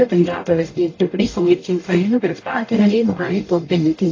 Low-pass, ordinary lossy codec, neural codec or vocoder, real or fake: 7.2 kHz; AAC, 32 kbps; codec, 44.1 kHz, 0.9 kbps, DAC; fake